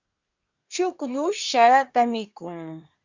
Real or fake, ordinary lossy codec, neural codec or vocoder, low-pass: fake; Opus, 64 kbps; codec, 24 kHz, 1 kbps, SNAC; 7.2 kHz